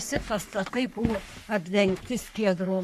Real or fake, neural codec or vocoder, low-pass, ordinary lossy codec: fake; codec, 44.1 kHz, 3.4 kbps, Pupu-Codec; 14.4 kHz; MP3, 64 kbps